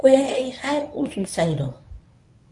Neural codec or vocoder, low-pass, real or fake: codec, 24 kHz, 0.9 kbps, WavTokenizer, medium speech release version 1; 10.8 kHz; fake